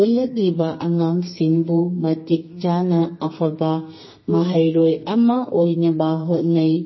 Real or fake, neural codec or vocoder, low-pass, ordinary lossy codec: fake; codec, 32 kHz, 1.9 kbps, SNAC; 7.2 kHz; MP3, 24 kbps